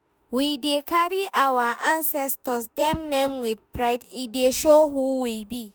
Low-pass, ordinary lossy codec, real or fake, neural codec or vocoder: none; none; fake; autoencoder, 48 kHz, 32 numbers a frame, DAC-VAE, trained on Japanese speech